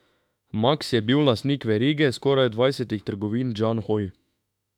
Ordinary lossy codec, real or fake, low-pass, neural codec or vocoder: none; fake; 19.8 kHz; autoencoder, 48 kHz, 32 numbers a frame, DAC-VAE, trained on Japanese speech